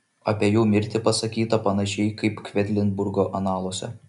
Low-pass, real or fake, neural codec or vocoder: 10.8 kHz; real; none